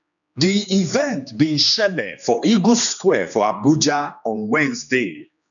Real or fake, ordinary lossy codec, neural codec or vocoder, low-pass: fake; none; codec, 16 kHz, 2 kbps, X-Codec, HuBERT features, trained on general audio; 7.2 kHz